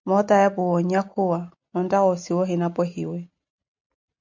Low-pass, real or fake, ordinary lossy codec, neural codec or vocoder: 7.2 kHz; real; MP3, 64 kbps; none